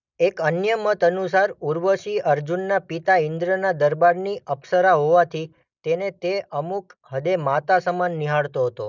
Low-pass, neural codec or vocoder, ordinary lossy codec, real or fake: 7.2 kHz; none; none; real